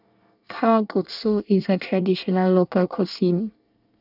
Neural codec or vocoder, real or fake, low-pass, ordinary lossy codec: codec, 24 kHz, 1 kbps, SNAC; fake; 5.4 kHz; none